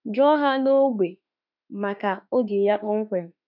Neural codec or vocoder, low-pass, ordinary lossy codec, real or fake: autoencoder, 48 kHz, 32 numbers a frame, DAC-VAE, trained on Japanese speech; 5.4 kHz; none; fake